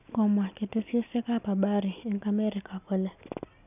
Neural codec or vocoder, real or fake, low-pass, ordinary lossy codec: none; real; 3.6 kHz; none